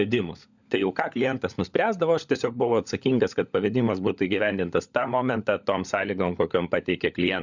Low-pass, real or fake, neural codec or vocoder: 7.2 kHz; fake; codec, 16 kHz, 16 kbps, FunCodec, trained on LibriTTS, 50 frames a second